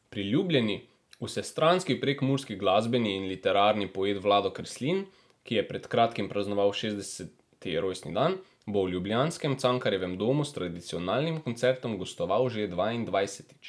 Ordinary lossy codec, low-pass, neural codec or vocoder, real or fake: none; none; none; real